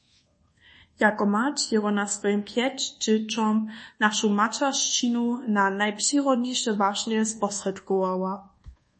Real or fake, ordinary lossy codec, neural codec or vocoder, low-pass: fake; MP3, 32 kbps; codec, 24 kHz, 1.2 kbps, DualCodec; 10.8 kHz